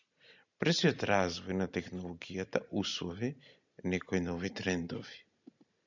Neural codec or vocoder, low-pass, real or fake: none; 7.2 kHz; real